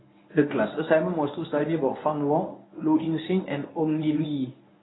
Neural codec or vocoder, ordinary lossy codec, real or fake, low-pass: codec, 24 kHz, 0.9 kbps, WavTokenizer, medium speech release version 1; AAC, 16 kbps; fake; 7.2 kHz